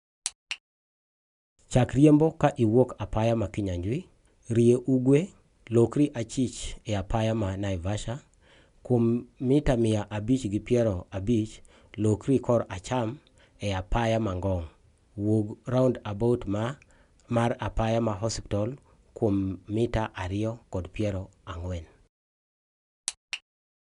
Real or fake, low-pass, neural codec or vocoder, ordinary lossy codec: real; 10.8 kHz; none; none